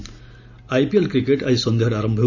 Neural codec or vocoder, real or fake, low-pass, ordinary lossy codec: none; real; 7.2 kHz; none